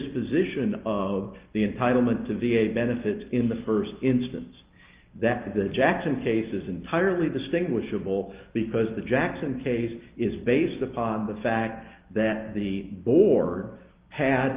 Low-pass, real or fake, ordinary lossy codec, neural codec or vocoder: 3.6 kHz; real; Opus, 32 kbps; none